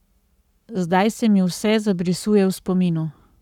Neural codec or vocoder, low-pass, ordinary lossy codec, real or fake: codec, 44.1 kHz, 7.8 kbps, Pupu-Codec; 19.8 kHz; none; fake